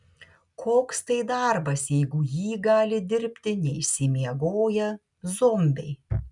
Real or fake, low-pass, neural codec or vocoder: real; 10.8 kHz; none